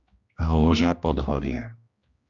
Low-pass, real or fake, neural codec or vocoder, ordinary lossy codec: 7.2 kHz; fake; codec, 16 kHz, 1 kbps, X-Codec, HuBERT features, trained on general audio; Opus, 64 kbps